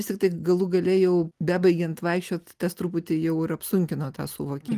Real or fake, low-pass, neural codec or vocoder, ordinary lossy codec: real; 14.4 kHz; none; Opus, 24 kbps